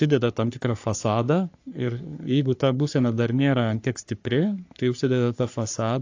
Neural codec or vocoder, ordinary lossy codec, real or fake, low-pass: codec, 44.1 kHz, 3.4 kbps, Pupu-Codec; AAC, 48 kbps; fake; 7.2 kHz